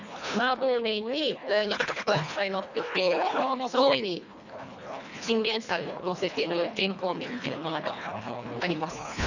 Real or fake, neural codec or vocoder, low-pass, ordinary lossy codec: fake; codec, 24 kHz, 1.5 kbps, HILCodec; 7.2 kHz; none